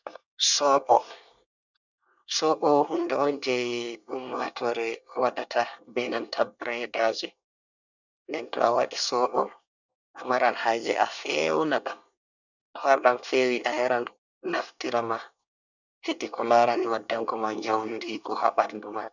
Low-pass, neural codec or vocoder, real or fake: 7.2 kHz; codec, 24 kHz, 1 kbps, SNAC; fake